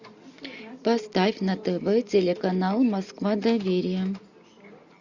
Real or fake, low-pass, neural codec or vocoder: real; 7.2 kHz; none